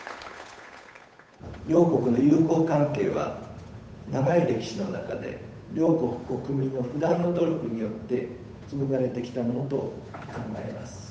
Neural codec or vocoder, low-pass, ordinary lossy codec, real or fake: codec, 16 kHz, 8 kbps, FunCodec, trained on Chinese and English, 25 frames a second; none; none; fake